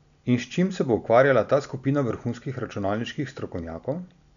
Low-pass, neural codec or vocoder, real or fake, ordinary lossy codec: 7.2 kHz; none; real; none